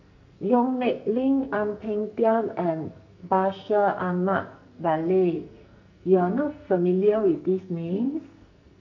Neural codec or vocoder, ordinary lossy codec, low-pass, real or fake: codec, 44.1 kHz, 2.6 kbps, SNAC; none; 7.2 kHz; fake